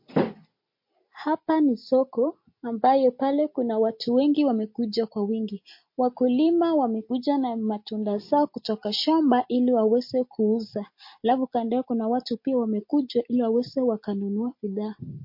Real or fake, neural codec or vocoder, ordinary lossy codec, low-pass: real; none; MP3, 32 kbps; 5.4 kHz